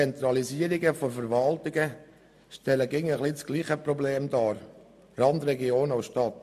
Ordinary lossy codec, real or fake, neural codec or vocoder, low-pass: MP3, 64 kbps; real; none; 14.4 kHz